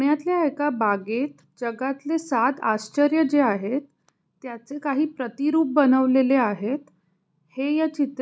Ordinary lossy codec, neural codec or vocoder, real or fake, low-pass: none; none; real; none